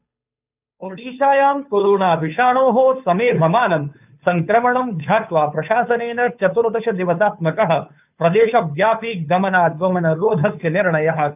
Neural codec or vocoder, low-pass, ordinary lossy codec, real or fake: codec, 16 kHz, 2 kbps, FunCodec, trained on Chinese and English, 25 frames a second; 3.6 kHz; none; fake